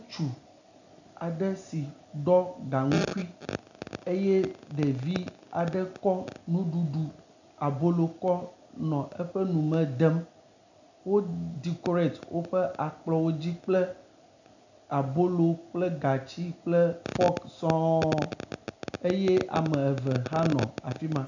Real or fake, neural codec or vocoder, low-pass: real; none; 7.2 kHz